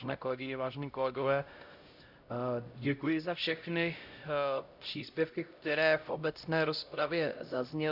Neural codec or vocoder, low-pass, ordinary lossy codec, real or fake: codec, 16 kHz, 0.5 kbps, X-Codec, HuBERT features, trained on LibriSpeech; 5.4 kHz; Opus, 64 kbps; fake